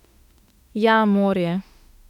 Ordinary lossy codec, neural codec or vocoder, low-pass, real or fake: none; autoencoder, 48 kHz, 32 numbers a frame, DAC-VAE, trained on Japanese speech; 19.8 kHz; fake